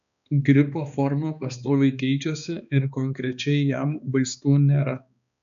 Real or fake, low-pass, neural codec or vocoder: fake; 7.2 kHz; codec, 16 kHz, 2 kbps, X-Codec, HuBERT features, trained on balanced general audio